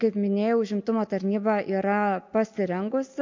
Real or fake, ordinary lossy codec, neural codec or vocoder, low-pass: real; MP3, 48 kbps; none; 7.2 kHz